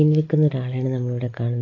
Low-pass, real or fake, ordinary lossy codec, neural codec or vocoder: 7.2 kHz; real; AAC, 32 kbps; none